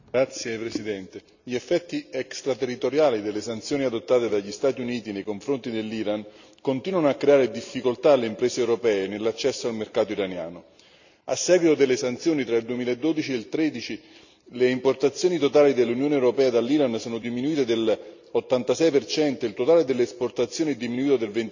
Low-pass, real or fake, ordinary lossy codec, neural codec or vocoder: 7.2 kHz; real; none; none